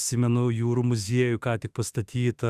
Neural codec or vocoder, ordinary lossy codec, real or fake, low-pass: autoencoder, 48 kHz, 32 numbers a frame, DAC-VAE, trained on Japanese speech; Opus, 64 kbps; fake; 14.4 kHz